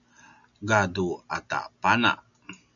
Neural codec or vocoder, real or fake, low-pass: none; real; 7.2 kHz